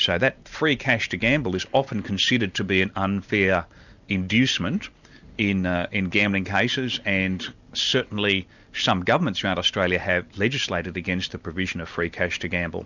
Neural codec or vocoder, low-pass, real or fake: none; 7.2 kHz; real